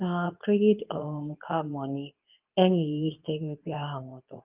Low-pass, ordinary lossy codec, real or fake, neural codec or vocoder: 3.6 kHz; Opus, 24 kbps; fake; codec, 24 kHz, 0.9 kbps, WavTokenizer, medium speech release version 2